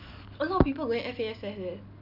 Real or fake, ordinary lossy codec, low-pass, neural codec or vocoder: real; none; 5.4 kHz; none